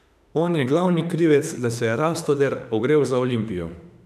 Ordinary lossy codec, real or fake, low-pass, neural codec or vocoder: none; fake; 14.4 kHz; autoencoder, 48 kHz, 32 numbers a frame, DAC-VAE, trained on Japanese speech